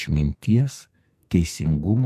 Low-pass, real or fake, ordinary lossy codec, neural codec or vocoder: 14.4 kHz; fake; MP3, 64 kbps; codec, 44.1 kHz, 2.6 kbps, SNAC